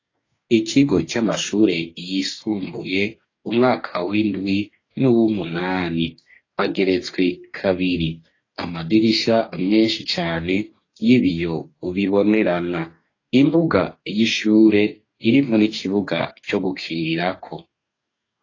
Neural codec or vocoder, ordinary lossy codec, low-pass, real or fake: codec, 44.1 kHz, 2.6 kbps, DAC; AAC, 32 kbps; 7.2 kHz; fake